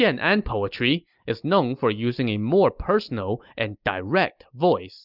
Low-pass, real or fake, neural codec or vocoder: 5.4 kHz; real; none